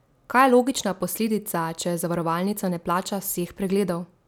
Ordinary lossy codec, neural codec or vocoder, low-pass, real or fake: none; none; none; real